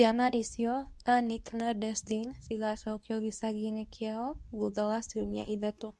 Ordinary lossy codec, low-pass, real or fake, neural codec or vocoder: none; none; fake; codec, 24 kHz, 0.9 kbps, WavTokenizer, medium speech release version 2